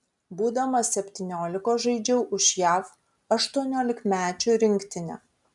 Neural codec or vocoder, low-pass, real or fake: none; 10.8 kHz; real